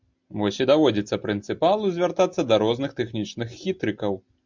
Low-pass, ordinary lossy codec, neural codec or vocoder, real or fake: 7.2 kHz; MP3, 64 kbps; none; real